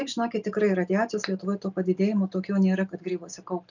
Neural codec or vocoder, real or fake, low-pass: none; real; 7.2 kHz